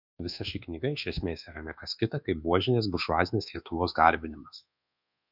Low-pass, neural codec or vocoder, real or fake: 5.4 kHz; codec, 24 kHz, 1.2 kbps, DualCodec; fake